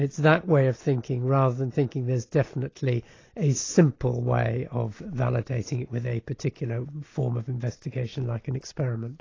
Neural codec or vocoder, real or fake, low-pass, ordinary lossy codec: none; real; 7.2 kHz; AAC, 32 kbps